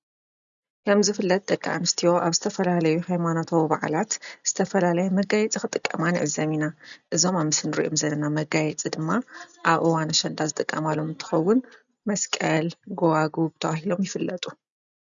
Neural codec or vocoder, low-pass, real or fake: none; 7.2 kHz; real